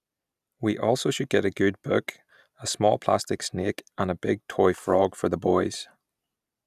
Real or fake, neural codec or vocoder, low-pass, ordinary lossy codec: real; none; 14.4 kHz; none